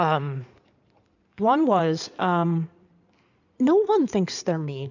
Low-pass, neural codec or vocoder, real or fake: 7.2 kHz; codec, 16 kHz in and 24 kHz out, 2.2 kbps, FireRedTTS-2 codec; fake